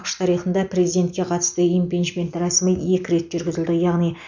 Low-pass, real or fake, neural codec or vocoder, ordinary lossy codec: 7.2 kHz; real; none; none